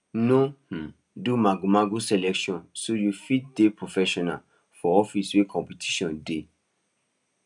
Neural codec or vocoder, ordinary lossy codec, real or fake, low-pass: none; none; real; 10.8 kHz